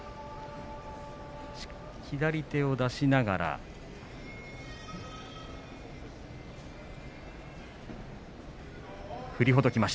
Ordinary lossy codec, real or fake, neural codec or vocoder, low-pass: none; real; none; none